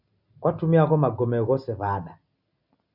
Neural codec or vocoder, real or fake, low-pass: none; real; 5.4 kHz